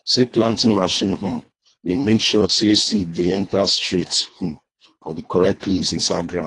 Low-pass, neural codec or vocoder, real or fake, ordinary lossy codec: 10.8 kHz; codec, 24 kHz, 1.5 kbps, HILCodec; fake; AAC, 48 kbps